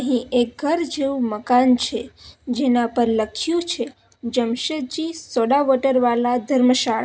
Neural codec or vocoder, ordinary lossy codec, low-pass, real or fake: none; none; none; real